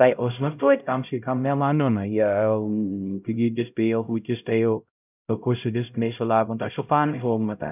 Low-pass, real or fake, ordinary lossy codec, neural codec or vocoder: 3.6 kHz; fake; none; codec, 16 kHz, 0.5 kbps, X-Codec, HuBERT features, trained on LibriSpeech